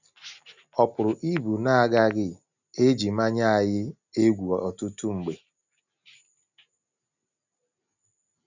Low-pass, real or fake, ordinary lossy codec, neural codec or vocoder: 7.2 kHz; real; none; none